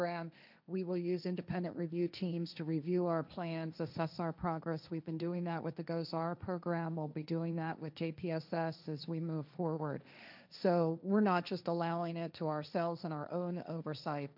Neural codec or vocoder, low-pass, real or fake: codec, 16 kHz, 1.1 kbps, Voila-Tokenizer; 5.4 kHz; fake